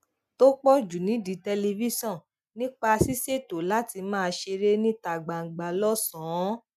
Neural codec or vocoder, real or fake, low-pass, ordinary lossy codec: none; real; 14.4 kHz; none